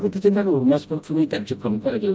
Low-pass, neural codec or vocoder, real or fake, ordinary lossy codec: none; codec, 16 kHz, 0.5 kbps, FreqCodec, smaller model; fake; none